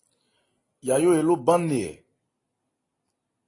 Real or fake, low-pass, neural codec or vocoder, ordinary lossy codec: real; 10.8 kHz; none; AAC, 32 kbps